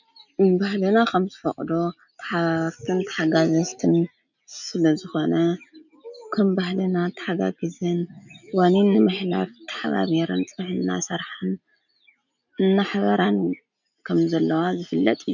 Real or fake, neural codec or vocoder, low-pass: real; none; 7.2 kHz